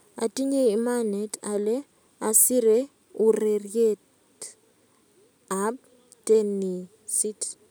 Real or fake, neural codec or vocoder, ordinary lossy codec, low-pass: real; none; none; none